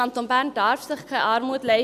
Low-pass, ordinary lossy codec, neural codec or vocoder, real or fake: 14.4 kHz; none; none; real